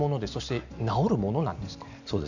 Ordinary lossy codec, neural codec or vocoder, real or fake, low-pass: none; none; real; 7.2 kHz